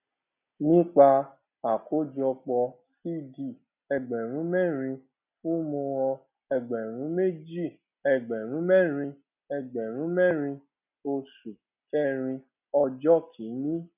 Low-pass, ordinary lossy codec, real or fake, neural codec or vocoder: 3.6 kHz; none; real; none